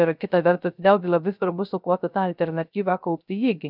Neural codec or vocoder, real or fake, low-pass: codec, 16 kHz, 0.3 kbps, FocalCodec; fake; 5.4 kHz